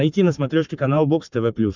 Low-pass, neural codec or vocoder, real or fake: 7.2 kHz; codec, 44.1 kHz, 7.8 kbps, Pupu-Codec; fake